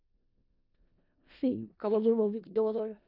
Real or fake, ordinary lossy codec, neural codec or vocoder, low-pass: fake; none; codec, 16 kHz in and 24 kHz out, 0.4 kbps, LongCat-Audio-Codec, four codebook decoder; 5.4 kHz